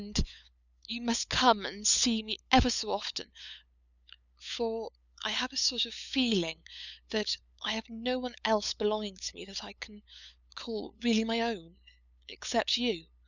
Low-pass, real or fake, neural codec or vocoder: 7.2 kHz; fake; codec, 16 kHz, 8 kbps, FunCodec, trained on LibriTTS, 25 frames a second